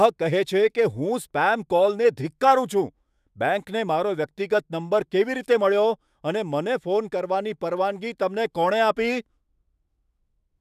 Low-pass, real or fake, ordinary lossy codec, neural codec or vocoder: 14.4 kHz; fake; none; autoencoder, 48 kHz, 128 numbers a frame, DAC-VAE, trained on Japanese speech